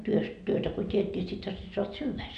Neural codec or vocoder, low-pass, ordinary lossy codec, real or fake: none; 9.9 kHz; none; real